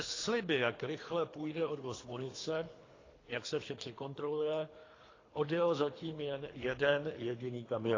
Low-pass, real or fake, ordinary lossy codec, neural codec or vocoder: 7.2 kHz; fake; AAC, 32 kbps; codec, 24 kHz, 3 kbps, HILCodec